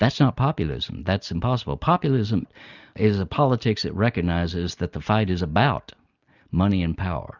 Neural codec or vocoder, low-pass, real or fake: none; 7.2 kHz; real